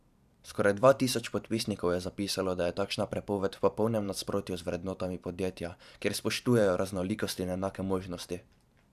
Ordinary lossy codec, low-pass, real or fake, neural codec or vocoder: none; 14.4 kHz; fake; vocoder, 44.1 kHz, 128 mel bands every 512 samples, BigVGAN v2